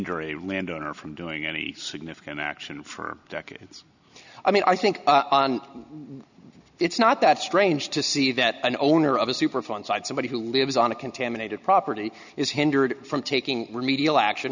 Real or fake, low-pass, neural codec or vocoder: real; 7.2 kHz; none